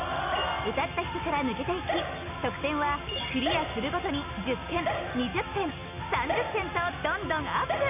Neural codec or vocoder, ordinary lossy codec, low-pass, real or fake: none; none; 3.6 kHz; real